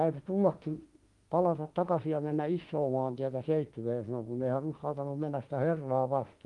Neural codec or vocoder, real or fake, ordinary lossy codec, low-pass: autoencoder, 48 kHz, 32 numbers a frame, DAC-VAE, trained on Japanese speech; fake; Opus, 24 kbps; 10.8 kHz